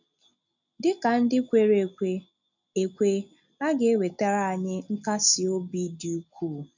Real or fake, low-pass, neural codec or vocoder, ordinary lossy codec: real; 7.2 kHz; none; none